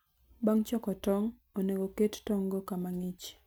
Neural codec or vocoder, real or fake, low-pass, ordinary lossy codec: none; real; none; none